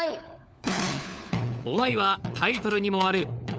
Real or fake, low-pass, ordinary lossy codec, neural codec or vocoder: fake; none; none; codec, 16 kHz, 4 kbps, FunCodec, trained on LibriTTS, 50 frames a second